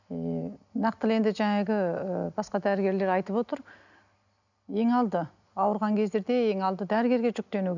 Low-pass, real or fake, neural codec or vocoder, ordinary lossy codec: 7.2 kHz; real; none; none